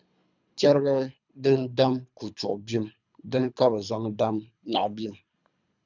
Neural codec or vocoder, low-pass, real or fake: codec, 24 kHz, 3 kbps, HILCodec; 7.2 kHz; fake